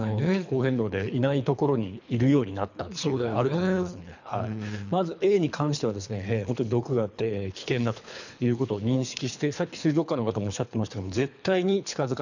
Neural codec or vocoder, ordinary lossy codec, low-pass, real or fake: codec, 24 kHz, 3 kbps, HILCodec; none; 7.2 kHz; fake